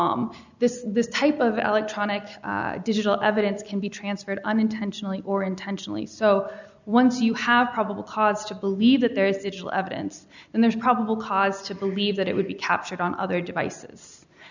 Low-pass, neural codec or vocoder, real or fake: 7.2 kHz; none; real